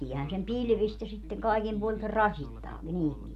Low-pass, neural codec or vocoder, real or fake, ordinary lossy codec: 14.4 kHz; none; real; none